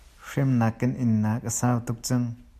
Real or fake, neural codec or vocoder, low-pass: real; none; 14.4 kHz